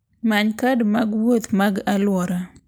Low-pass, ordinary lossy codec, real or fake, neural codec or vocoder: none; none; real; none